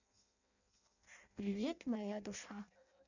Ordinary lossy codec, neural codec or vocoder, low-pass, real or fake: none; codec, 16 kHz in and 24 kHz out, 0.6 kbps, FireRedTTS-2 codec; 7.2 kHz; fake